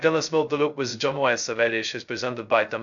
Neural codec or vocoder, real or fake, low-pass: codec, 16 kHz, 0.2 kbps, FocalCodec; fake; 7.2 kHz